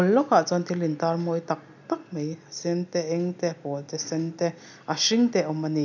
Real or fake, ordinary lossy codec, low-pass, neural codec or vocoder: real; none; 7.2 kHz; none